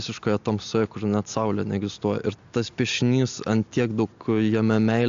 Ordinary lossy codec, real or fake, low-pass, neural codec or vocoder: AAC, 64 kbps; real; 7.2 kHz; none